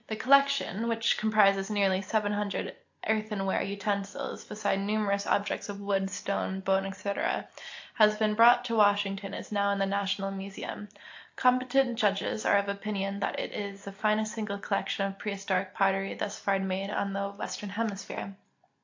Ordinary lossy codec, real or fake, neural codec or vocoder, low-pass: AAC, 48 kbps; real; none; 7.2 kHz